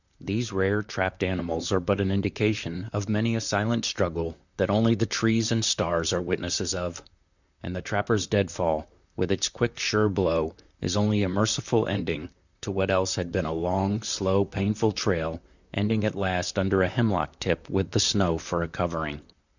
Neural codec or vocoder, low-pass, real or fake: vocoder, 44.1 kHz, 128 mel bands, Pupu-Vocoder; 7.2 kHz; fake